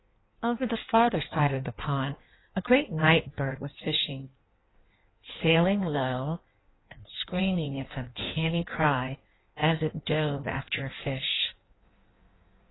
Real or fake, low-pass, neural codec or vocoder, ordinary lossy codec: fake; 7.2 kHz; codec, 16 kHz in and 24 kHz out, 1.1 kbps, FireRedTTS-2 codec; AAC, 16 kbps